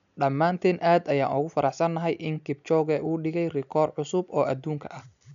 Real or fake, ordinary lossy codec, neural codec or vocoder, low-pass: real; none; none; 7.2 kHz